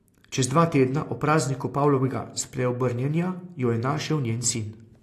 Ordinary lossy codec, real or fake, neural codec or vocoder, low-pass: AAC, 48 kbps; real; none; 14.4 kHz